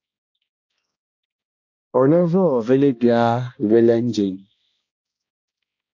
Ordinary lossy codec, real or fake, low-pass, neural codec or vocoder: AAC, 48 kbps; fake; 7.2 kHz; codec, 16 kHz, 1 kbps, X-Codec, HuBERT features, trained on balanced general audio